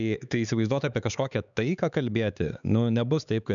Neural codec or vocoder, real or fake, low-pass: codec, 16 kHz, 4 kbps, X-Codec, HuBERT features, trained on LibriSpeech; fake; 7.2 kHz